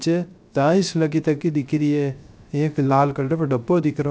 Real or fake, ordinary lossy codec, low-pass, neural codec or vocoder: fake; none; none; codec, 16 kHz, 0.3 kbps, FocalCodec